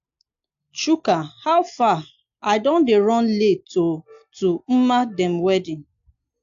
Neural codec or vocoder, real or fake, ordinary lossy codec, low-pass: none; real; AAC, 64 kbps; 7.2 kHz